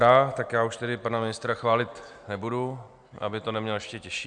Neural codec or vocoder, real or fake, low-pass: none; real; 9.9 kHz